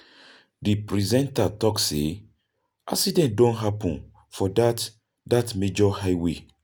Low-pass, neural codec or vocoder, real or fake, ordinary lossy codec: none; none; real; none